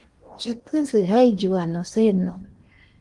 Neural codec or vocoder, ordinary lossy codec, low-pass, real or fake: codec, 16 kHz in and 24 kHz out, 0.8 kbps, FocalCodec, streaming, 65536 codes; Opus, 24 kbps; 10.8 kHz; fake